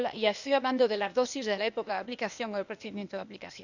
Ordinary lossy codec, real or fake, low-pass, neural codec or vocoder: none; fake; 7.2 kHz; codec, 16 kHz, 0.8 kbps, ZipCodec